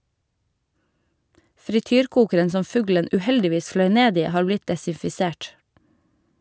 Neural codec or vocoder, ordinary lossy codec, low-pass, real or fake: none; none; none; real